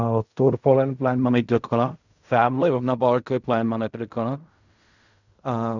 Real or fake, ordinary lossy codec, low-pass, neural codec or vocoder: fake; none; 7.2 kHz; codec, 16 kHz in and 24 kHz out, 0.4 kbps, LongCat-Audio-Codec, fine tuned four codebook decoder